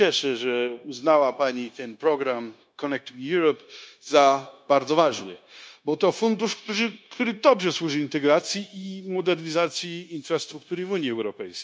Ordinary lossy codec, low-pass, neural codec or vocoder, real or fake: none; none; codec, 16 kHz, 0.9 kbps, LongCat-Audio-Codec; fake